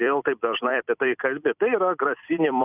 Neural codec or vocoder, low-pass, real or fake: vocoder, 44.1 kHz, 128 mel bands every 256 samples, BigVGAN v2; 3.6 kHz; fake